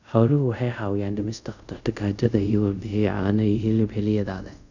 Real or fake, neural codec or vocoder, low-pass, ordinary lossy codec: fake; codec, 24 kHz, 0.5 kbps, DualCodec; 7.2 kHz; none